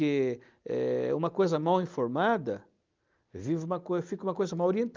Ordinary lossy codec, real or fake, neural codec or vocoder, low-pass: Opus, 32 kbps; real; none; 7.2 kHz